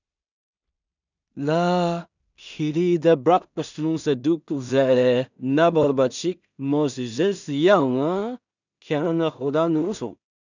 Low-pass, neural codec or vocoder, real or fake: 7.2 kHz; codec, 16 kHz in and 24 kHz out, 0.4 kbps, LongCat-Audio-Codec, two codebook decoder; fake